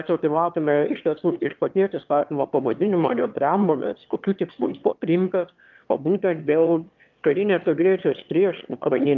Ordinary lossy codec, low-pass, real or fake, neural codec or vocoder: Opus, 24 kbps; 7.2 kHz; fake; autoencoder, 22.05 kHz, a latent of 192 numbers a frame, VITS, trained on one speaker